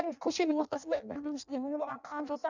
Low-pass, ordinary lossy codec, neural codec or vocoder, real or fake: 7.2 kHz; none; codec, 16 kHz in and 24 kHz out, 0.6 kbps, FireRedTTS-2 codec; fake